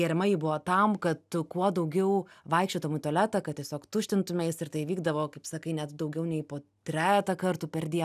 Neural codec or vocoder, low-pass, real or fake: none; 14.4 kHz; real